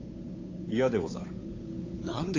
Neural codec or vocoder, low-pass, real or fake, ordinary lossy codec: codec, 16 kHz, 8 kbps, FunCodec, trained on Chinese and English, 25 frames a second; 7.2 kHz; fake; AAC, 32 kbps